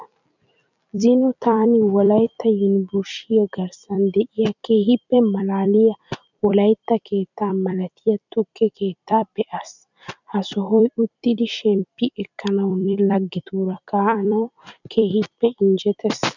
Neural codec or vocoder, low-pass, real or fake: vocoder, 44.1 kHz, 128 mel bands every 256 samples, BigVGAN v2; 7.2 kHz; fake